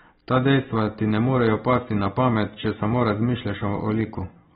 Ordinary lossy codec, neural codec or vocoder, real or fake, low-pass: AAC, 16 kbps; none; real; 7.2 kHz